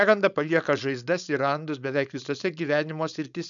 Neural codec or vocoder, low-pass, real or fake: codec, 16 kHz, 4.8 kbps, FACodec; 7.2 kHz; fake